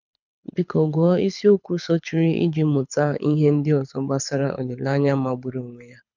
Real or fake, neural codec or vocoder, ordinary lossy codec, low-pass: fake; codec, 24 kHz, 6 kbps, HILCodec; none; 7.2 kHz